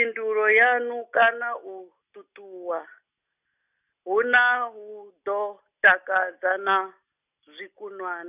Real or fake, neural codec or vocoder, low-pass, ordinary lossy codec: real; none; 3.6 kHz; none